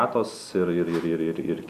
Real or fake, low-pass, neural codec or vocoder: real; 14.4 kHz; none